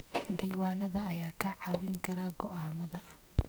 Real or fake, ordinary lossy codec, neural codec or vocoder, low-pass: fake; none; codec, 44.1 kHz, 2.6 kbps, SNAC; none